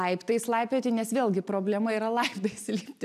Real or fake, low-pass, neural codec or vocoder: real; 14.4 kHz; none